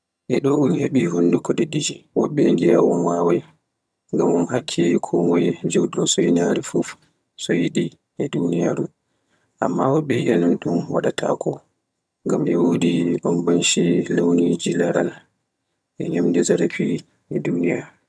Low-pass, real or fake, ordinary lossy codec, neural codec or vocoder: none; fake; none; vocoder, 22.05 kHz, 80 mel bands, HiFi-GAN